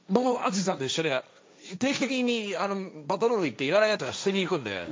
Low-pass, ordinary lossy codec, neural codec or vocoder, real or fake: none; none; codec, 16 kHz, 1.1 kbps, Voila-Tokenizer; fake